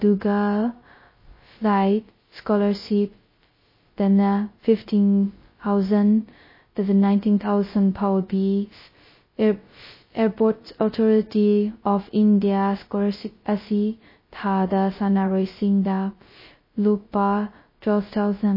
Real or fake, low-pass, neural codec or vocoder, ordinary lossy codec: fake; 5.4 kHz; codec, 16 kHz, 0.2 kbps, FocalCodec; MP3, 24 kbps